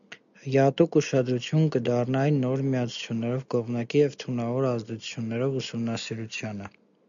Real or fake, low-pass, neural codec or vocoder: real; 7.2 kHz; none